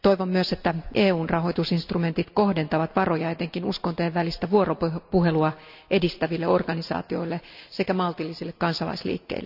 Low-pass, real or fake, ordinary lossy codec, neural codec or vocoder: 5.4 kHz; real; none; none